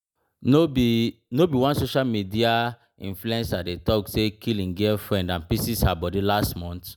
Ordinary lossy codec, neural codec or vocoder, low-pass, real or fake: none; none; none; real